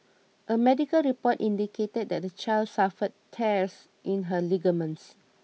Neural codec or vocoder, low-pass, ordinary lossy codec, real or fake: none; none; none; real